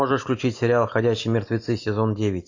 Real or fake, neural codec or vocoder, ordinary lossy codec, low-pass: real; none; AAC, 48 kbps; 7.2 kHz